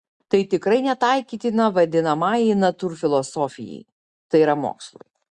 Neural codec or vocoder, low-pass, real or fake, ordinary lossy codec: none; 10.8 kHz; real; Opus, 64 kbps